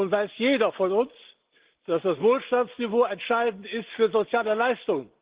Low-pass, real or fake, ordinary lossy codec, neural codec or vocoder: 3.6 kHz; real; Opus, 16 kbps; none